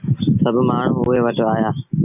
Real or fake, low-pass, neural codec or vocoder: real; 3.6 kHz; none